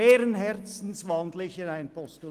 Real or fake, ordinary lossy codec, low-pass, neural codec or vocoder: real; Opus, 24 kbps; 14.4 kHz; none